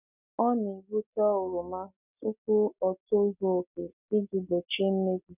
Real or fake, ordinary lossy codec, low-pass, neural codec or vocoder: real; none; 3.6 kHz; none